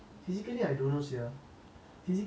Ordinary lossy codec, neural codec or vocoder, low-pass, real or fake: none; none; none; real